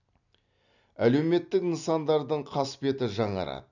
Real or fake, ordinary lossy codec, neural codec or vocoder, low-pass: real; none; none; 7.2 kHz